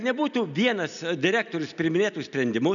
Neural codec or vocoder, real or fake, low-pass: none; real; 7.2 kHz